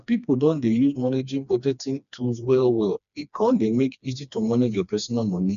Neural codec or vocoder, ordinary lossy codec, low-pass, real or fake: codec, 16 kHz, 2 kbps, FreqCodec, smaller model; none; 7.2 kHz; fake